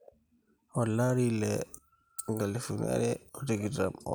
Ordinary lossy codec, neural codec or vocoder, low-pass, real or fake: none; none; none; real